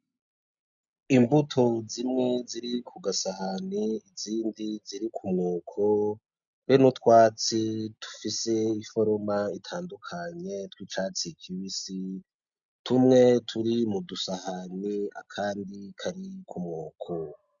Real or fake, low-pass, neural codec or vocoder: real; 7.2 kHz; none